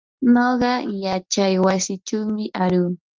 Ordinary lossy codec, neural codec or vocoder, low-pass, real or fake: Opus, 32 kbps; none; 7.2 kHz; real